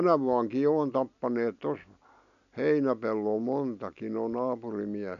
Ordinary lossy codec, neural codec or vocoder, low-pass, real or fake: none; none; 7.2 kHz; real